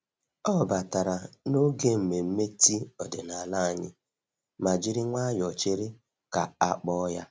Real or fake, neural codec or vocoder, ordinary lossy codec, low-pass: real; none; none; none